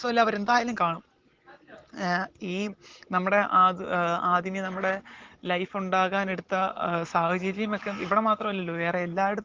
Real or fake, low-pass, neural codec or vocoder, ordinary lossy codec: real; 7.2 kHz; none; Opus, 16 kbps